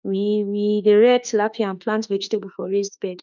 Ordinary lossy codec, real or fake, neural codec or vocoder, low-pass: none; fake; autoencoder, 48 kHz, 32 numbers a frame, DAC-VAE, trained on Japanese speech; 7.2 kHz